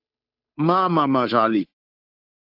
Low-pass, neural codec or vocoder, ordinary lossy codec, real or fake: 5.4 kHz; codec, 16 kHz, 2 kbps, FunCodec, trained on Chinese and English, 25 frames a second; AAC, 32 kbps; fake